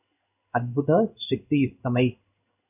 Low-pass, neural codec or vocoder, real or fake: 3.6 kHz; codec, 16 kHz in and 24 kHz out, 1 kbps, XY-Tokenizer; fake